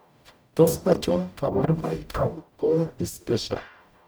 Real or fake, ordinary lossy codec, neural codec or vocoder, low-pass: fake; none; codec, 44.1 kHz, 0.9 kbps, DAC; none